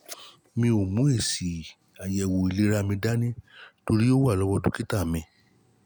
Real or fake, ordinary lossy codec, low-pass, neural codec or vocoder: real; none; none; none